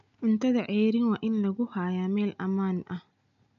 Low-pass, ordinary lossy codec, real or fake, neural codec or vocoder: 7.2 kHz; none; real; none